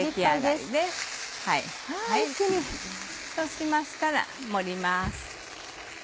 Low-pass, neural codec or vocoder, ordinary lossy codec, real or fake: none; none; none; real